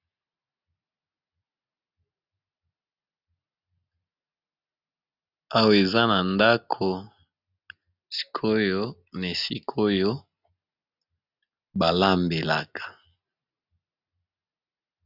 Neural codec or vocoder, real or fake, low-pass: none; real; 5.4 kHz